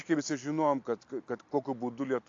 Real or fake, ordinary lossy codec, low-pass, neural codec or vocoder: real; AAC, 48 kbps; 7.2 kHz; none